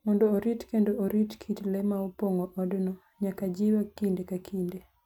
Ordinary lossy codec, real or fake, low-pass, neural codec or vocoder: none; real; 19.8 kHz; none